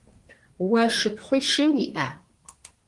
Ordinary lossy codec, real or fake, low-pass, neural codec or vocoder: Opus, 24 kbps; fake; 10.8 kHz; codec, 24 kHz, 1 kbps, SNAC